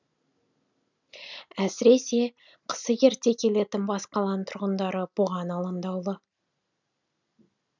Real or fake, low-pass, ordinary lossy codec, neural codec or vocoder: real; 7.2 kHz; none; none